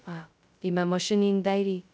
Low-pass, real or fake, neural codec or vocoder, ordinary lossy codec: none; fake; codec, 16 kHz, 0.2 kbps, FocalCodec; none